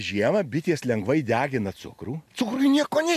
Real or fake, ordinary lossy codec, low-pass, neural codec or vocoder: real; AAC, 96 kbps; 14.4 kHz; none